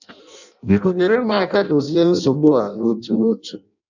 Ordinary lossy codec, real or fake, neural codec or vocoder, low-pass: none; fake; codec, 16 kHz in and 24 kHz out, 0.6 kbps, FireRedTTS-2 codec; 7.2 kHz